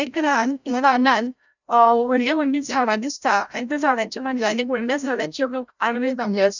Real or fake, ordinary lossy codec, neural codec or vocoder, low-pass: fake; none; codec, 16 kHz, 0.5 kbps, FreqCodec, larger model; 7.2 kHz